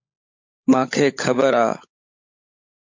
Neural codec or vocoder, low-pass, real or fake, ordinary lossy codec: codec, 16 kHz, 16 kbps, FunCodec, trained on LibriTTS, 50 frames a second; 7.2 kHz; fake; MP3, 48 kbps